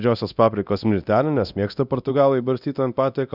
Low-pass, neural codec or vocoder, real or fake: 5.4 kHz; codec, 24 kHz, 0.9 kbps, DualCodec; fake